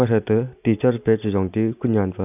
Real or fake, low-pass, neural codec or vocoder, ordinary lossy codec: real; 3.6 kHz; none; none